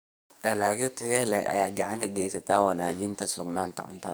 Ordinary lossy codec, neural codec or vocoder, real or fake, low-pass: none; codec, 44.1 kHz, 2.6 kbps, SNAC; fake; none